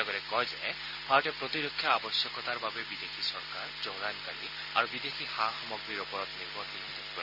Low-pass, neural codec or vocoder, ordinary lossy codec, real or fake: 5.4 kHz; none; none; real